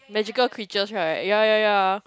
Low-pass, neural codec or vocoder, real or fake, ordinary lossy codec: none; none; real; none